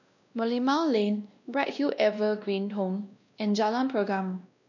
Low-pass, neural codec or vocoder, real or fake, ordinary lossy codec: 7.2 kHz; codec, 16 kHz, 1 kbps, X-Codec, WavLM features, trained on Multilingual LibriSpeech; fake; none